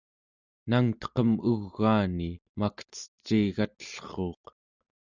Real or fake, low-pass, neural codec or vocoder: real; 7.2 kHz; none